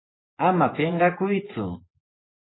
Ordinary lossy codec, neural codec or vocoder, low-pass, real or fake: AAC, 16 kbps; vocoder, 24 kHz, 100 mel bands, Vocos; 7.2 kHz; fake